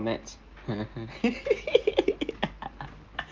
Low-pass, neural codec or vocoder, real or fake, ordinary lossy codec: 7.2 kHz; none; real; Opus, 32 kbps